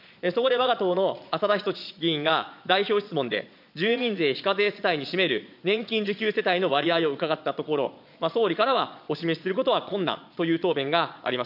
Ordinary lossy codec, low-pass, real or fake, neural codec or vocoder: none; 5.4 kHz; fake; vocoder, 22.05 kHz, 80 mel bands, Vocos